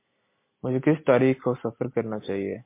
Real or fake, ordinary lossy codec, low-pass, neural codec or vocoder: real; MP3, 24 kbps; 3.6 kHz; none